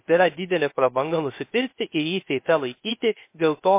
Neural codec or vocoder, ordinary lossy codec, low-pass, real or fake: codec, 16 kHz, 0.3 kbps, FocalCodec; MP3, 24 kbps; 3.6 kHz; fake